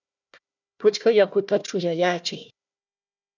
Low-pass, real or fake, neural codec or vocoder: 7.2 kHz; fake; codec, 16 kHz, 1 kbps, FunCodec, trained on Chinese and English, 50 frames a second